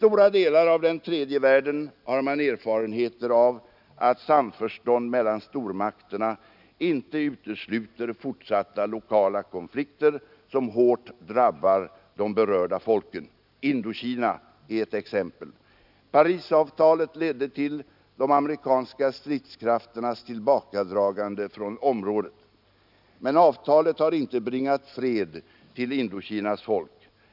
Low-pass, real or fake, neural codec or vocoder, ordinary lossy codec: 5.4 kHz; real; none; none